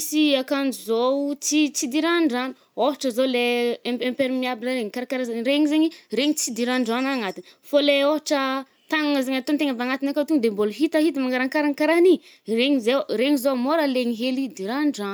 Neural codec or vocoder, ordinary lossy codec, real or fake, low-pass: none; none; real; none